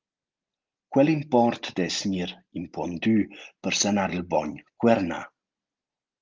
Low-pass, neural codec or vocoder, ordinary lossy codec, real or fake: 7.2 kHz; none; Opus, 32 kbps; real